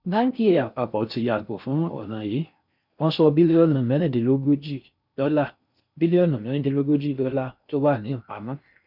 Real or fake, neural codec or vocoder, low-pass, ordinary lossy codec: fake; codec, 16 kHz in and 24 kHz out, 0.6 kbps, FocalCodec, streaming, 4096 codes; 5.4 kHz; none